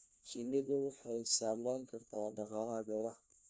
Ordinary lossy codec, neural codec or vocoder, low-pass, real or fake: none; codec, 16 kHz, 1 kbps, FunCodec, trained on LibriTTS, 50 frames a second; none; fake